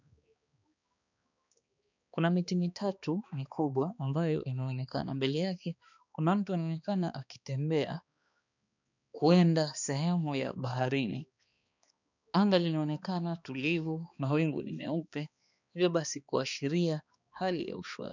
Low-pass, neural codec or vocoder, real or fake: 7.2 kHz; codec, 16 kHz, 2 kbps, X-Codec, HuBERT features, trained on balanced general audio; fake